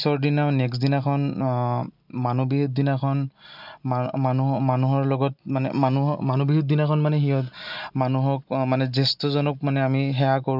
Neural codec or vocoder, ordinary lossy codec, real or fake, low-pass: none; none; real; 5.4 kHz